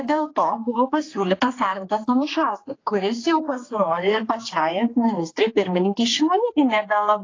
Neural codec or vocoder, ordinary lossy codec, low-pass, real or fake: codec, 16 kHz, 2 kbps, X-Codec, HuBERT features, trained on general audio; AAC, 32 kbps; 7.2 kHz; fake